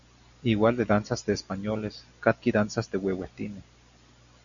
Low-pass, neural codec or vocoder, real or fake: 7.2 kHz; none; real